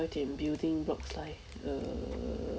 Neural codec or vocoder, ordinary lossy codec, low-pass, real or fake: none; none; none; real